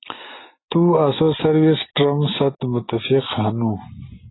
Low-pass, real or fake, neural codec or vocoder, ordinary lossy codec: 7.2 kHz; real; none; AAC, 16 kbps